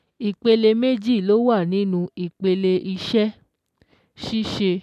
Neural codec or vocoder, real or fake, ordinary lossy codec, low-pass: none; real; none; 14.4 kHz